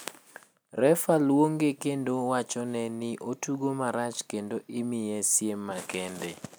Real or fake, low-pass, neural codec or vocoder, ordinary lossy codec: real; none; none; none